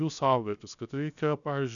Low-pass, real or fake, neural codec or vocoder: 7.2 kHz; fake; codec, 16 kHz, 0.7 kbps, FocalCodec